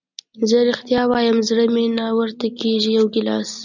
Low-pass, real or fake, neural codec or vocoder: 7.2 kHz; real; none